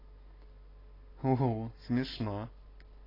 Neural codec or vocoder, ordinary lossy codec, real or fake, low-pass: none; AAC, 24 kbps; real; 5.4 kHz